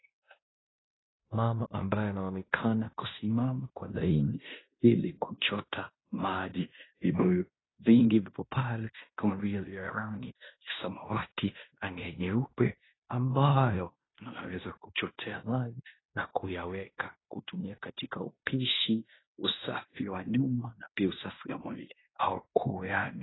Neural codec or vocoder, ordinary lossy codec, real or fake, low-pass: codec, 16 kHz in and 24 kHz out, 0.9 kbps, LongCat-Audio-Codec, fine tuned four codebook decoder; AAC, 16 kbps; fake; 7.2 kHz